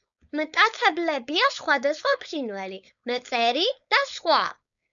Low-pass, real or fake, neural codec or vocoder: 7.2 kHz; fake; codec, 16 kHz, 4.8 kbps, FACodec